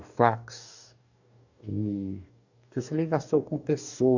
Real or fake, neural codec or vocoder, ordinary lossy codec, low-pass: fake; codec, 44.1 kHz, 2.6 kbps, DAC; none; 7.2 kHz